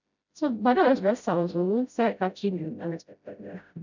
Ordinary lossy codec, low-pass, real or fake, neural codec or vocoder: none; 7.2 kHz; fake; codec, 16 kHz, 0.5 kbps, FreqCodec, smaller model